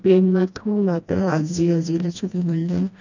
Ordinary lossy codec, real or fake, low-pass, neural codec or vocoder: none; fake; 7.2 kHz; codec, 16 kHz, 1 kbps, FreqCodec, smaller model